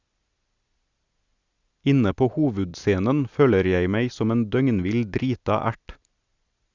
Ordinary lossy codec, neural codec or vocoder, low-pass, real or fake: Opus, 64 kbps; none; 7.2 kHz; real